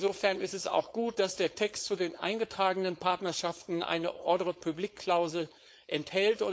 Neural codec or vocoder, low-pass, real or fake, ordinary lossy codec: codec, 16 kHz, 4.8 kbps, FACodec; none; fake; none